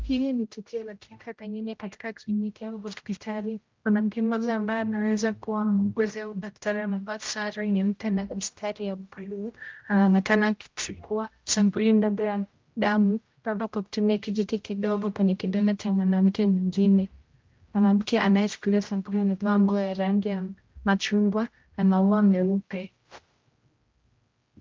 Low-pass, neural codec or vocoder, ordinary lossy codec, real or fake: 7.2 kHz; codec, 16 kHz, 0.5 kbps, X-Codec, HuBERT features, trained on general audio; Opus, 24 kbps; fake